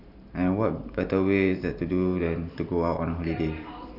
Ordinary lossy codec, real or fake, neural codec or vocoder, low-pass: none; fake; vocoder, 44.1 kHz, 128 mel bands every 512 samples, BigVGAN v2; 5.4 kHz